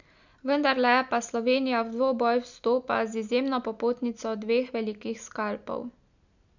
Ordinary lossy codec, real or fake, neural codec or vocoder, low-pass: none; real; none; 7.2 kHz